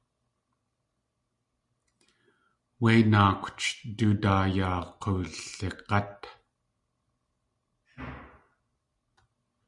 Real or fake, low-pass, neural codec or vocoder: real; 10.8 kHz; none